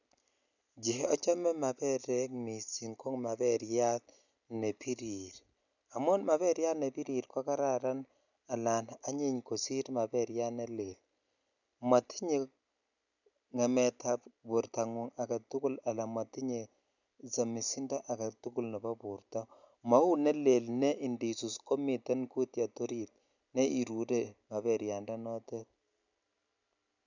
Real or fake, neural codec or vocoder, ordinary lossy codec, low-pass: real; none; none; 7.2 kHz